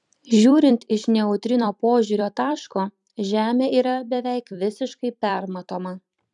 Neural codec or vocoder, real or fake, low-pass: vocoder, 44.1 kHz, 128 mel bands every 256 samples, BigVGAN v2; fake; 10.8 kHz